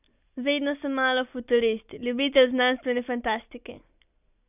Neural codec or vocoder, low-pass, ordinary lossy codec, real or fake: none; 3.6 kHz; none; real